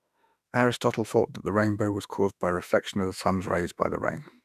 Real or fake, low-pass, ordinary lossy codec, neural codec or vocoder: fake; 14.4 kHz; none; autoencoder, 48 kHz, 32 numbers a frame, DAC-VAE, trained on Japanese speech